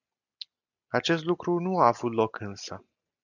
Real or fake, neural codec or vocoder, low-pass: real; none; 7.2 kHz